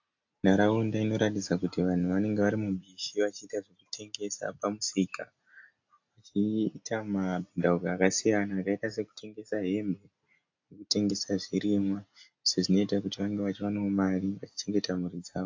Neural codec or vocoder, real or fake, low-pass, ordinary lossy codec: none; real; 7.2 kHz; AAC, 48 kbps